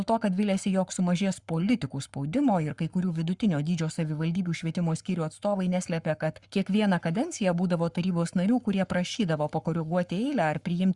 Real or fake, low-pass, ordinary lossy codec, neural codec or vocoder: fake; 10.8 kHz; Opus, 64 kbps; codec, 44.1 kHz, 7.8 kbps, Pupu-Codec